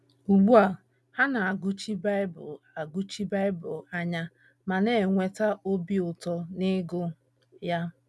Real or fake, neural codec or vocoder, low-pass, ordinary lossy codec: real; none; none; none